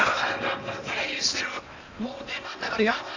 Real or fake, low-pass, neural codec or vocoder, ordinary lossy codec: fake; 7.2 kHz; codec, 16 kHz in and 24 kHz out, 0.8 kbps, FocalCodec, streaming, 65536 codes; AAC, 48 kbps